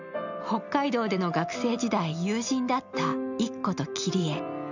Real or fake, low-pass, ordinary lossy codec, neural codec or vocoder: real; 7.2 kHz; none; none